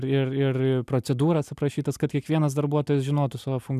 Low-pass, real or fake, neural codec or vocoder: 14.4 kHz; real; none